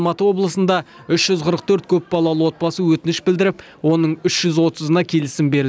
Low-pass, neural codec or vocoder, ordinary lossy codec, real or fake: none; none; none; real